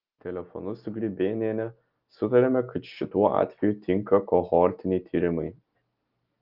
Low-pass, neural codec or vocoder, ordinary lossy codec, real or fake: 5.4 kHz; none; Opus, 32 kbps; real